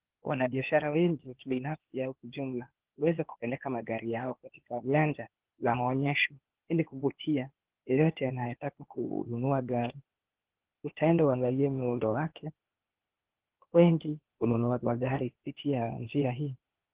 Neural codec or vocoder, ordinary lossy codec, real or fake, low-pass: codec, 16 kHz, 0.8 kbps, ZipCodec; Opus, 16 kbps; fake; 3.6 kHz